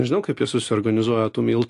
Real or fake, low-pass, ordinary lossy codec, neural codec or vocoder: real; 10.8 kHz; AAC, 48 kbps; none